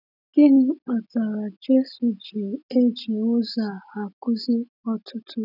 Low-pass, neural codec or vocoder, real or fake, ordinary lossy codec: 5.4 kHz; none; real; none